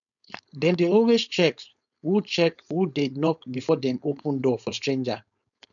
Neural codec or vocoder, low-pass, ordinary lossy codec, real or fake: codec, 16 kHz, 4.8 kbps, FACodec; 7.2 kHz; none; fake